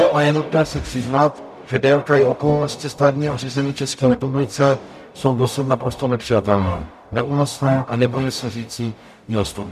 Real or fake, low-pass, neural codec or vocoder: fake; 14.4 kHz; codec, 44.1 kHz, 0.9 kbps, DAC